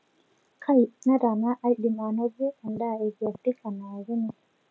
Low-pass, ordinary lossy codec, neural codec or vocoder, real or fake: none; none; none; real